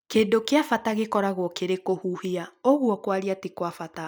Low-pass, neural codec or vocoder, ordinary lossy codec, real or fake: none; none; none; real